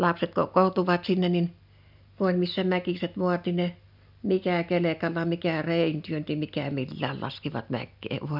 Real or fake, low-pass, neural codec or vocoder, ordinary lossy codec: real; 5.4 kHz; none; none